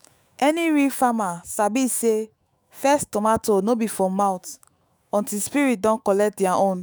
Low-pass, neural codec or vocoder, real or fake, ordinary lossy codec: none; autoencoder, 48 kHz, 128 numbers a frame, DAC-VAE, trained on Japanese speech; fake; none